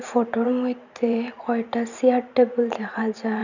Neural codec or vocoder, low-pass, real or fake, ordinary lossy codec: vocoder, 44.1 kHz, 128 mel bands every 512 samples, BigVGAN v2; 7.2 kHz; fake; none